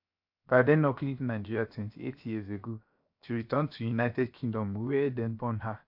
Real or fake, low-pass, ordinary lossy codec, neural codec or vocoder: fake; 5.4 kHz; AAC, 48 kbps; codec, 16 kHz, 0.7 kbps, FocalCodec